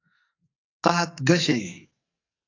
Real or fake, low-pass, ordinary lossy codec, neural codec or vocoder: fake; 7.2 kHz; AAC, 48 kbps; codec, 44.1 kHz, 2.6 kbps, SNAC